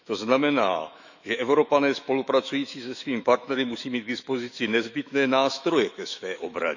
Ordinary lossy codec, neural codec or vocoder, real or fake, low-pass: none; autoencoder, 48 kHz, 128 numbers a frame, DAC-VAE, trained on Japanese speech; fake; 7.2 kHz